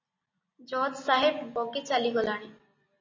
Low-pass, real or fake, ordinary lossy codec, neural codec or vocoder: 7.2 kHz; real; MP3, 32 kbps; none